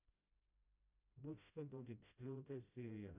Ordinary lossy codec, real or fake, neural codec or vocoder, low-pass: none; fake; codec, 16 kHz, 0.5 kbps, FreqCodec, smaller model; 3.6 kHz